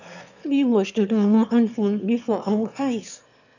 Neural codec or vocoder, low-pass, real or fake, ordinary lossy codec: autoencoder, 22.05 kHz, a latent of 192 numbers a frame, VITS, trained on one speaker; 7.2 kHz; fake; none